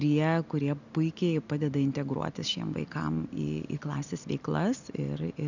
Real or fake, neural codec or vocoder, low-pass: real; none; 7.2 kHz